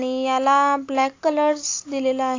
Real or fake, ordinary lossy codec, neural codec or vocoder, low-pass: real; AAC, 48 kbps; none; 7.2 kHz